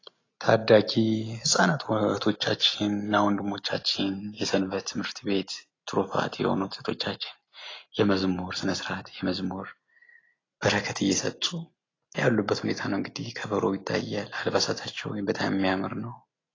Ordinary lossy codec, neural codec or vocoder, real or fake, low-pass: AAC, 32 kbps; none; real; 7.2 kHz